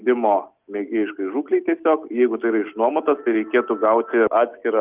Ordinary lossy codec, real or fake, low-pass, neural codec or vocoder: Opus, 24 kbps; real; 3.6 kHz; none